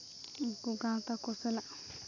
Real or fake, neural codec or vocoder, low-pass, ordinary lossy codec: real; none; 7.2 kHz; AAC, 48 kbps